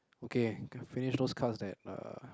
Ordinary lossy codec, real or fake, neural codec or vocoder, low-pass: none; real; none; none